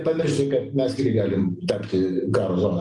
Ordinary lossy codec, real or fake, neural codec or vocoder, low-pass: Opus, 32 kbps; real; none; 10.8 kHz